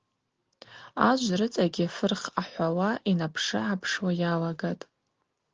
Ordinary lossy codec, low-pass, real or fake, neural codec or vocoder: Opus, 16 kbps; 7.2 kHz; real; none